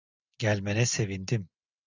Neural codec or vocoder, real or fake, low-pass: none; real; 7.2 kHz